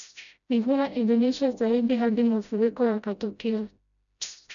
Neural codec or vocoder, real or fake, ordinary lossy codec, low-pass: codec, 16 kHz, 0.5 kbps, FreqCodec, smaller model; fake; MP3, 96 kbps; 7.2 kHz